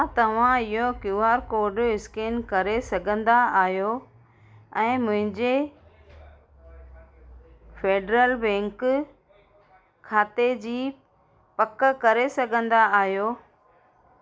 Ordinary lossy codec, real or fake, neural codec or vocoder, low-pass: none; real; none; none